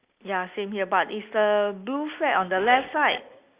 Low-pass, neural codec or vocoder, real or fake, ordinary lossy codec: 3.6 kHz; none; real; Opus, 64 kbps